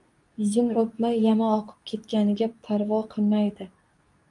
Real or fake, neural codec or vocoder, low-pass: fake; codec, 24 kHz, 0.9 kbps, WavTokenizer, medium speech release version 2; 10.8 kHz